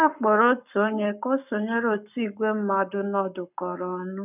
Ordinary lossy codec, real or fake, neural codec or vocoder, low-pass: none; fake; codec, 44.1 kHz, 7.8 kbps, Pupu-Codec; 3.6 kHz